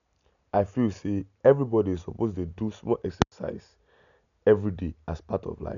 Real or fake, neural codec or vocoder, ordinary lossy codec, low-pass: real; none; none; 7.2 kHz